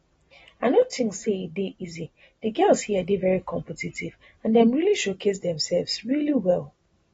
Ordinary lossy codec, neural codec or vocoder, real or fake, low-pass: AAC, 24 kbps; none; real; 19.8 kHz